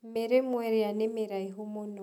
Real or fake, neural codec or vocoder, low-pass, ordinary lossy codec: real; none; 19.8 kHz; none